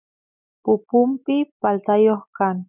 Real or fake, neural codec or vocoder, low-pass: real; none; 3.6 kHz